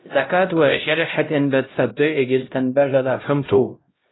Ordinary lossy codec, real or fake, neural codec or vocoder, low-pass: AAC, 16 kbps; fake; codec, 16 kHz, 0.5 kbps, X-Codec, HuBERT features, trained on LibriSpeech; 7.2 kHz